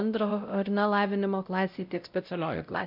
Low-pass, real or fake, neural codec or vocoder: 5.4 kHz; fake; codec, 16 kHz, 0.5 kbps, X-Codec, WavLM features, trained on Multilingual LibriSpeech